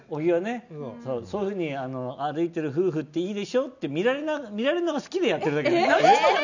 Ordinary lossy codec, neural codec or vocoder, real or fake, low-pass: none; none; real; 7.2 kHz